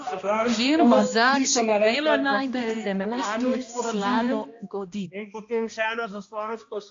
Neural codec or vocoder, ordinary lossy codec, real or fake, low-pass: codec, 16 kHz, 1 kbps, X-Codec, HuBERT features, trained on balanced general audio; AAC, 48 kbps; fake; 7.2 kHz